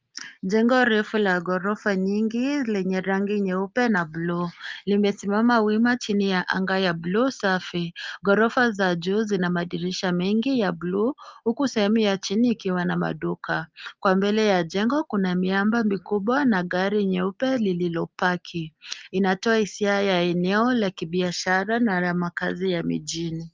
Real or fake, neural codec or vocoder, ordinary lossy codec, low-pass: real; none; Opus, 32 kbps; 7.2 kHz